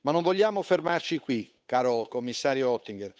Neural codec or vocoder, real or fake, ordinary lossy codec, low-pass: codec, 16 kHz, 8 kbps, FunCodec, trained on Chinese and English, 25 frames a second; fake; none; none